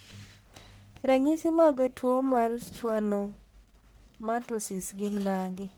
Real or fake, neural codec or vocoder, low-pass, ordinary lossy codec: fake; codec, 44.1 kHz, 1.7 kbps, Pupu-Codec; none; none